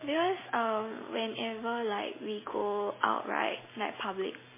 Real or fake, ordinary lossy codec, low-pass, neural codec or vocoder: real; MP3, 16 kbps; 3.6 kHz; none